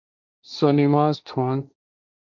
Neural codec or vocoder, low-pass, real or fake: codec, 16 kHz, 1.1 kbps, Voila-Tokenizer; 7.2 kHz; fake